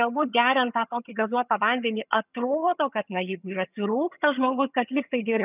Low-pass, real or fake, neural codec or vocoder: 3.6 kHz; fake; vocoder, 22.05 kHz, 80 mel bands, HiFi-GAN